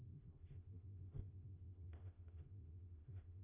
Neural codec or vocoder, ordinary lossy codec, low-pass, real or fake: codec, 16 kHz, 0.5 kbps, FreqCodec, smaller model; AAC, 16 kbps; 3.6 kHz; fake